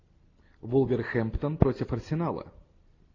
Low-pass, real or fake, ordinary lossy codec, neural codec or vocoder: 7.2 kHz; real; AAC, 32 kbps; none